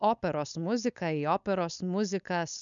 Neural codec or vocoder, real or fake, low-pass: codec, 16 kHz, 4.8 kbps, FACodec; fake; 7.2 kHz